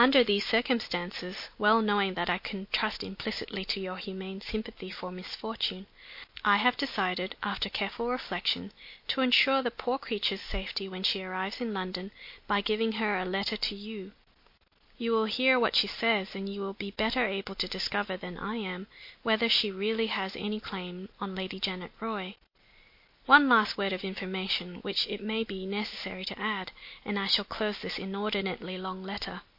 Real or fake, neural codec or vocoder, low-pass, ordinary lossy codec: real; none; 5.4 kHz; MP3, 32 kbps